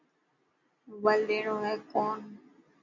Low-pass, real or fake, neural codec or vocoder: 7.2 kHz; real; none